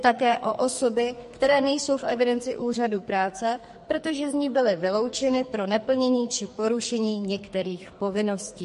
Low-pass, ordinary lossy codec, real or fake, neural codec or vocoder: 14.4 kHz; MP3, 48 kbps; fake; codec, 44.1 kHz, 2.6 kbps, SNAC